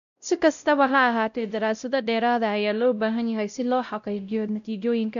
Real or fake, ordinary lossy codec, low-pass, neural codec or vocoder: fake; none; 7.2 kHz; codec, 16 kHz, 0.5 kbps, X-Codec, WavLM features, trained on Multilingual LibriSpeech